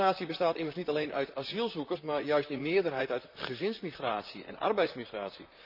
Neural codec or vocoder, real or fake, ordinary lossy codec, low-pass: vocoder, 44.1 kHz, 128 mel bands, Pupu-Vocoder; fake; none; 5.4 kHz